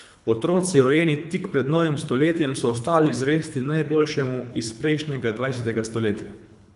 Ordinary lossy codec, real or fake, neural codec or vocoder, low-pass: none; fake; codec, 24 kHz, 3 kbps, HILCodec; 10.8 kHz